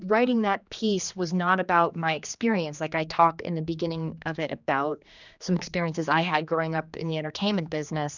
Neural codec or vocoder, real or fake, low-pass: codec, 16 kHz, 2 kbps, X-Codec, HuBERT features, trained on general audio; fake; 7.2 kHz